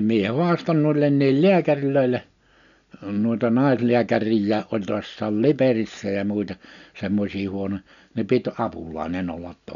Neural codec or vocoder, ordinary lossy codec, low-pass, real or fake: none; none; 7.2 kHz; real